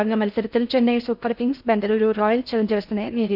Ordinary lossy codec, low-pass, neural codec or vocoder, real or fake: none; 5.4 kHz; codec, 16 kHz in and 24 kHz out, 0.6 kbps, FocalCodec, streaming, 4096 codes; fake